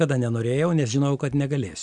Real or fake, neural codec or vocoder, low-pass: real; none; 9.9 kHz